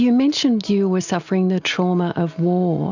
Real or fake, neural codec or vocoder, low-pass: real; none; 7.2 kHz